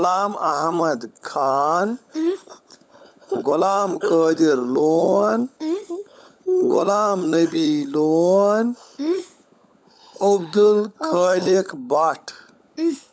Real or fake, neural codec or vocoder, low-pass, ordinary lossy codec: fake; codec, 16 kHz, 16 kbps, FunCodec, trained on LibriTTS, 50 frames a second; none; none